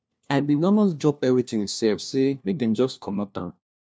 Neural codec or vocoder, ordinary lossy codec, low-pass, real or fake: codec, 16 kHz, 1 kbps, FunCodec, trained on LibriTTS, 50 frames a second; none; none; fake